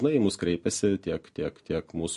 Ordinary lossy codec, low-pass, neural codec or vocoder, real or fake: MP3, 48 kbps; 14.4 kHz; none; real